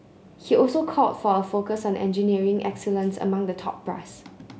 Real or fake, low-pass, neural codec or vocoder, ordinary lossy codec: real; none; none; none